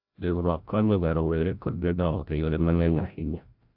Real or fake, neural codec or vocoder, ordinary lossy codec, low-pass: fake; codec, 16 kHz, 0.5 kbps, FreqCodec, larger model; AAC, 48 kbps; 5.4 kHz